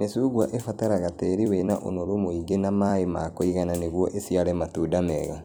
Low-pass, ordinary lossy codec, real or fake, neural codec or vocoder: none; none; fake; vocoder, 44.1 kHz, 128 mel bands every 256 samples, BigVGAN v2